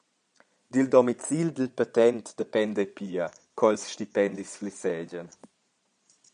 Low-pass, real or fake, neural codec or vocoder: 9.9 kHz; fake; vocoder, 22.05 kHz, 80 mel bands, Vocos